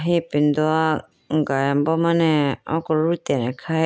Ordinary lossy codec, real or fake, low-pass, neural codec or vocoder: none; real; none; none